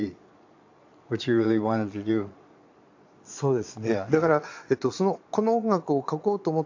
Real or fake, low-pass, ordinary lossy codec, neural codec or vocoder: fake; 7.2 kHz; AAC, 48 kbps; vocoder, 22.05 kHz, 80 mel bands, Vocos